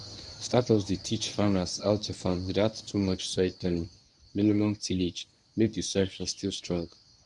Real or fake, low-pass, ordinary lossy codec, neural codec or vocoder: fake; 10.8 kHz; none; codec, 24 kHz, 0.9 kbps, WavTokenizer, medium speech release version 1